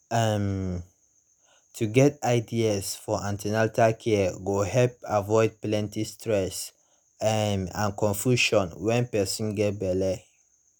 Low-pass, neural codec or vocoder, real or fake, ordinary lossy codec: none; none; real; none